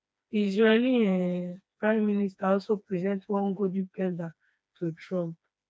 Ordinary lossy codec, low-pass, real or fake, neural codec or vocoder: none; none; fake; codec, 16 kHz, 2 kbps, FreqCodec, smaller model